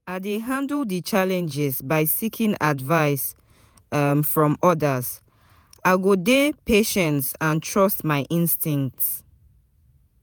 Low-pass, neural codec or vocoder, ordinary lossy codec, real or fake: none; vocoder, 48 kHz, 128 mel bands, Vocos; none; fake